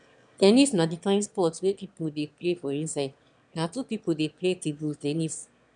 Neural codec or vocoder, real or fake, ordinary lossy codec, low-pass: autoencoder, 22.05 kHz, a latent of 192 numbers a frame, VITS, trained on one speaker; fake; none; 9.9 kHz